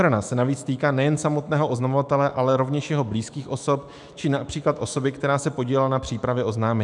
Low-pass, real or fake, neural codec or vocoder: 10.8 kHz; fake; autoencoder, 48 kHz, 128 numbers a frame, DAC-VAE, trained on Japanese speech